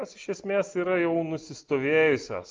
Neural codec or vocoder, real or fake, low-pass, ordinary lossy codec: none; real; 7.2 kHz; Opus, 32 kbps